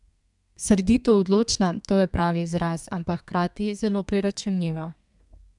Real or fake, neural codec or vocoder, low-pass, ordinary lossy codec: fake; codec, 32 kHz, 1.9 kbps, SNAC; 10.8 kHz; MP3, 96 kbps